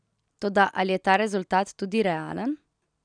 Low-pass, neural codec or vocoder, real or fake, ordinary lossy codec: 9.9 kHz; none; real; none